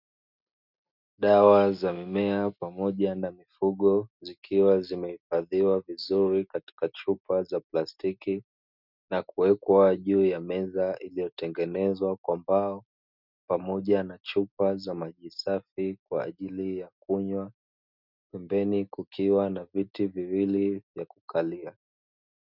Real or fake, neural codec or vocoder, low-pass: real; none; 5.4 kHz